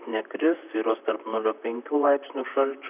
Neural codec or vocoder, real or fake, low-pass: vocoder, 44.1 kHz, 128 mel bands, Pupu-Vocoder; fake; 3.6 kHz